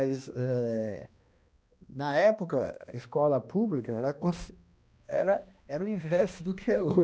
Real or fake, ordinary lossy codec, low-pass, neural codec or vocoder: fake; none; none; codec, 16 kHz, 1 kbps, X-Codec, HuBERT features, trained on balanced general audio